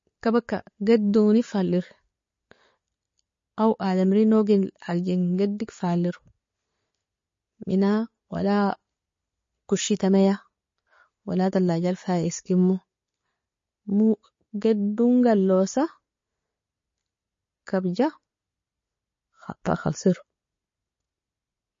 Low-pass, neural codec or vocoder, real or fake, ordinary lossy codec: 7.2 kHz; none; real; MP3, 32 kbps